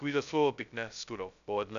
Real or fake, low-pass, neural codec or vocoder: fake; 7.2 kHz; codec, 16 kHz, 0.2 kbps, FocalCodec